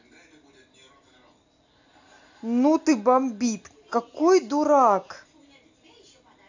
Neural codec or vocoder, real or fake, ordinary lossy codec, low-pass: none; real; AAC, 48 kbps; 7.2 kHz